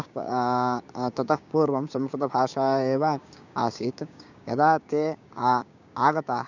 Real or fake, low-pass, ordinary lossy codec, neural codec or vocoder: fake; 7.2 kHz; none; codec, 16 kHz, 6 kbps, DAC